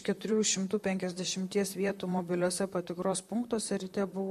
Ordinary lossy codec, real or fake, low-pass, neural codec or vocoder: MP3, 64 kbps; fake; 14.4 kHz; vocoder, 44.1 kHz, 128 mel bands, Pupu-Vocoder